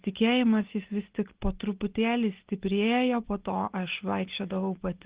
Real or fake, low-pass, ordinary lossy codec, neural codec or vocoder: real; 3.6 kHz; Opus, 16 kbps; none